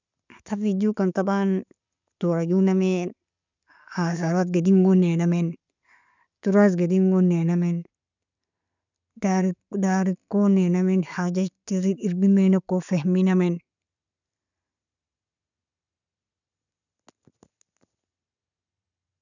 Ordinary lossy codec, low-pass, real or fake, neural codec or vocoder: none; 7.2 kHz; real; none